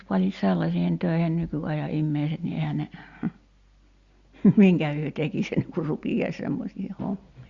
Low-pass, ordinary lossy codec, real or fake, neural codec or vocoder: 7.2 kHz; Opus, 64 kbps; real; none